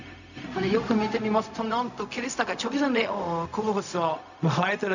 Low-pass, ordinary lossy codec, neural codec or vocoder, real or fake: 7.2 kHz; none; codec, 16 kHz, 0.4 kbps, LongCat-Audio-Codec; fake